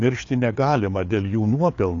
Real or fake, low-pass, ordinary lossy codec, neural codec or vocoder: fake; 7.2 kHz; AAC, 48 kbps; codec, 16 kHz, 4 kbps, FreqCodec, larger model